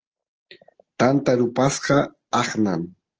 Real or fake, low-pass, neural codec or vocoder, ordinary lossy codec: real; 7.2 kHz; none; Opus, 16 kbps